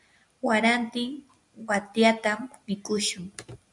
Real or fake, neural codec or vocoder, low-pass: real; none; 10.8 kHz